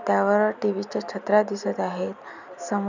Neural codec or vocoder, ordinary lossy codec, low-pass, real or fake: none; none; 7.2 kHz; real